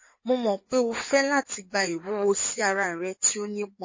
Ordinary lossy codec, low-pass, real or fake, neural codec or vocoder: MP3, 32 kbps; 7.2 kHz; fake; codec, 16 kHz in and 24 kHz out, 2.2 kbps, FireRedTTS-2 codec